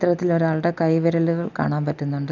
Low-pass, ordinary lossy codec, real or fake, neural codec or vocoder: 7.2 kHz; none; real; none